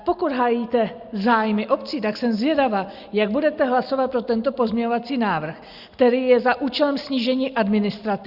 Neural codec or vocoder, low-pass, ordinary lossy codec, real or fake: none; 5.4 kHz; Opus, 64 kbps; real